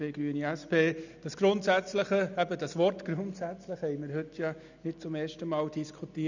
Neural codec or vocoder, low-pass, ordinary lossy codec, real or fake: none; 7.2 kHz; none; real